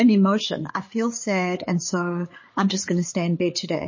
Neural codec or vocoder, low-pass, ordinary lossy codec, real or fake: codec, 16 kHz, 8 kbps, FreqCodec, larger model; 7.2 kHz; MP3, 32 kbps; fake